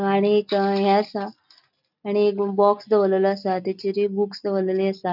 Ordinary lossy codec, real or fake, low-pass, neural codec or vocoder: none; real; 5.4 kHz; none